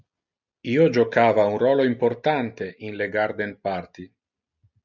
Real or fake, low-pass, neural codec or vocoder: real; 7.2 kHz; none